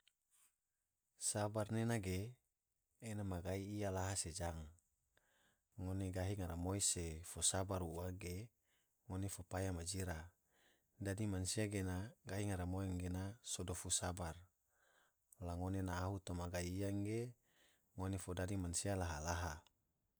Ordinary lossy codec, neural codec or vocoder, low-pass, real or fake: none; none; none; real